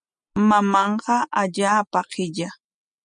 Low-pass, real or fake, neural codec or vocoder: 9.9 kHz; real; none